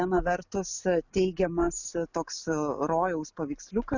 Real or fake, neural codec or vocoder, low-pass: real; none; 7.2 kHz